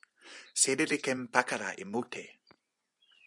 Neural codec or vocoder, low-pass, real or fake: vocoder, 24 kHz, 100 mel bands, Vocos; 10.8 kHz; fake